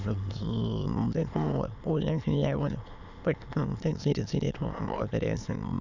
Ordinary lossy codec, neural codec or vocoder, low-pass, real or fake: none; autoencoder, 22.05 kHz, a latent of 192 numbers a frame, VITS, trained on many speakers; 7.2 kHz; fake